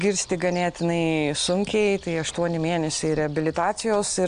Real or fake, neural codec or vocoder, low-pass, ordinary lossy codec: real; none; 9.9 kHz; AAC, 96 kbps